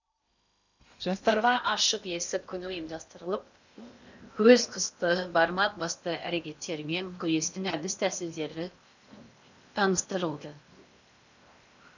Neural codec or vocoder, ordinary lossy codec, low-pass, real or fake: codec, 16 kHz in and 24 kHz out, 0.8 kbps, FocalCodec, streaming, 65536 codes; none; 7.2 kHz; fake